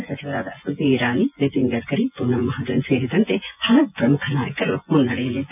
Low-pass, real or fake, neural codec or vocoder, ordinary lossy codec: 3.6 kHz; fake; vocoder, 24 kHz, 100 mel bands, Vocos; none